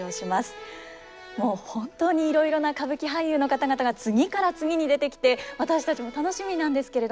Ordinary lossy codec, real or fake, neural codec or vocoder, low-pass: none; real; none; none